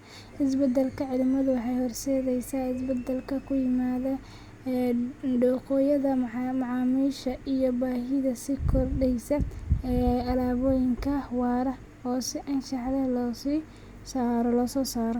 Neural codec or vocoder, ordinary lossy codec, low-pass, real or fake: none; Opus, 64 kbps; 19.8 kHz; real